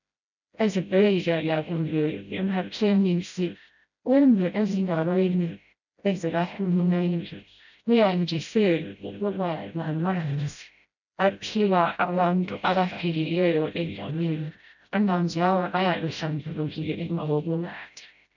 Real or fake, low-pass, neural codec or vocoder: fake; 7.2 kHz; codec, 16 kHz, 0.5 kbps, FreqCodec, smaller model